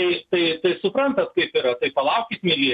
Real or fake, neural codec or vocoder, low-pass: real; none; 14.4 kHz